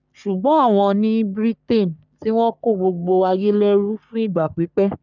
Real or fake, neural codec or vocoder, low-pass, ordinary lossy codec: fake; codec, 44.1 kHz, 3.4 kbps, Pupu-Codec; 7.2 kHz; none